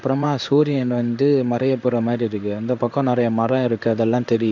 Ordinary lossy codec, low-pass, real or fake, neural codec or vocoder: none; 7.2 kHz; fake; codec, 16 kHz in and 24 kHz out, 1 kbps, XY-Tokenizer